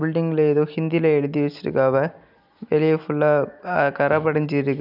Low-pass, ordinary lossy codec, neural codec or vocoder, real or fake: 5.4 kHz; none; none; real